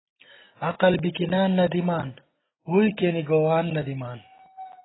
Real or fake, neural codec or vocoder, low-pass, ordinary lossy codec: real; none; 7.2 kHz; AAC, 16 kbps